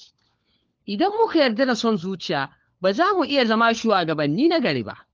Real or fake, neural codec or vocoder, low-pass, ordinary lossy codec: fake; codec, 16 kHz, 4 kbps, FunCodec, trained on LibriTTS, 50 frames a second; 7.2 kHz; Opus, 32 kbps